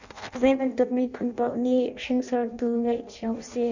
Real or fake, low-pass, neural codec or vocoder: fake; 7.2 kHz; codec, 16 kHz in and 24 kHz out, 0.6 kbps, FireRedTTS-2 codec